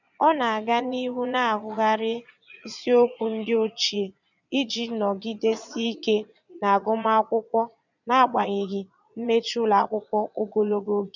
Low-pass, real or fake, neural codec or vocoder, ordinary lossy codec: 7.2 kHz; fake; vocoder, 22.05 kHz, 80 mel bands, Vocos; none